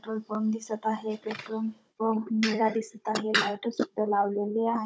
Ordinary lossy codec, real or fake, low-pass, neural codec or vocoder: none; fake; none; codec, 16 kHz, 16 kbps, FunCodec, trained on Chinese and English, 50 frames a second